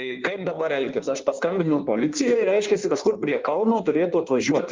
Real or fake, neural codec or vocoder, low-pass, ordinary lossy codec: fake; codec, 16 kHz in and 24 kHz out, 1.1 kbps, FireRedTTS-2 codec; 7.2 kHz; Opus, 24 kbps